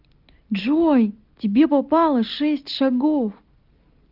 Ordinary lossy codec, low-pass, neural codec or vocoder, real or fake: Opus, 32 kbps; 5.4 kHz; none; real